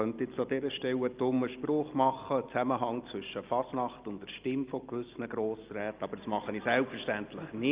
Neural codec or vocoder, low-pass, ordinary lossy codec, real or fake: none; 3.6 kHz; Opus, 32 kbps; real